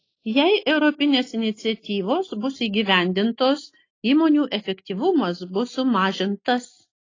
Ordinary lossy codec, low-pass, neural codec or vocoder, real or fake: AAC, 32 kbps; 7.2 kHz; none; real